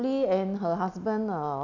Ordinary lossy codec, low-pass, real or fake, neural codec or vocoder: none; 7.2 kHz; real; none